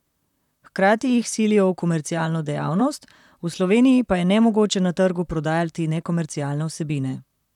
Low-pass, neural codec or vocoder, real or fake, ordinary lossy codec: 19.8 kHz; vocoder, 44.1 kHz, 128 mel bands, Pupu-Vocoder; fake; none